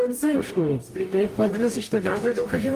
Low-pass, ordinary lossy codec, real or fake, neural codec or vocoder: 14.4 kHz; Opus, 32 kbps; fake; codec, 44.1 kHz, 0.9 kbps, DAC